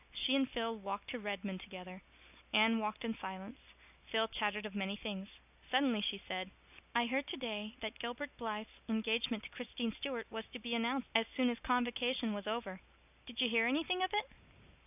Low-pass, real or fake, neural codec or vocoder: 3.6 kHz; real; none